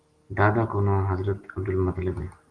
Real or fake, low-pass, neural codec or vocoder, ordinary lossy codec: real; 9.9 kHz; none; Opus, 24 kbps